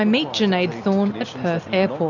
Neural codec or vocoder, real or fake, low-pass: none; real; 7.2 kHz